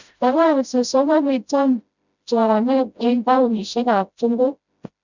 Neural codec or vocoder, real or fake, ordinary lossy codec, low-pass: codec, 16 kHz, 0.5 kbps, FreqCodec, smaller model; fake; none; 7.2 kHz